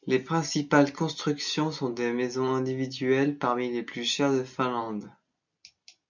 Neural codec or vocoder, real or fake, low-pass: none; real; 7.2 kHz